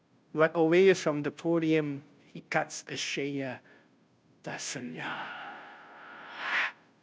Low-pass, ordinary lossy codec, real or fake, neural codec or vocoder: none; none; fake; codec, 16 kHz, 0.5 kbps, FunCodec, trained on Chinese and English, 25 frames a second